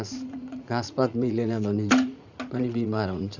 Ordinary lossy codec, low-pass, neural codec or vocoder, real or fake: none; 7.2 kHz; vocoder, 44.1 kHz, 80 mel bands, Vocos; fake